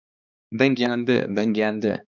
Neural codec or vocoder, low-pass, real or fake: codec, 16 kHz, 2 kbps, X-Codec, HuBERT features, trained on balanced general audio; 7.2 kHz; fake